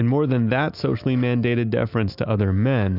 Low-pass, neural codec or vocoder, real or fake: 5.4 kHz; none; real